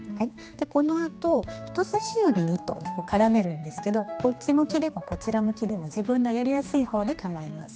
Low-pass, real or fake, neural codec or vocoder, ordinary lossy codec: none; fake; codec, 16 kHz, 2 kbps, X-Codec, HuBERT features, trained on general audio; none